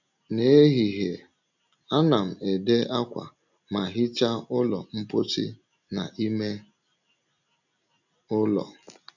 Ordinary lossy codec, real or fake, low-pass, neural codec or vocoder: none; real; 7.2 kHz; none